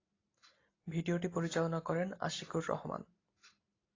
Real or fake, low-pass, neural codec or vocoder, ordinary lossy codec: real; 7.2 kHz; none; AAC, 32 kbps